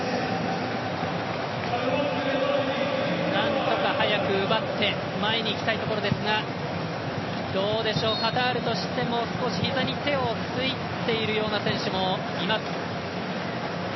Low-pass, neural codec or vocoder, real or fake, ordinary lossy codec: 7.2 kHz; none; real; MP3, 24 kbps